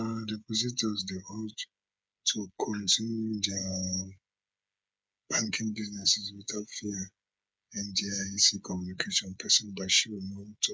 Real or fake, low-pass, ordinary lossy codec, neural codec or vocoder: fake; none; none; codec, 16 kHz, 8 kbps, FreqCodec, smaller model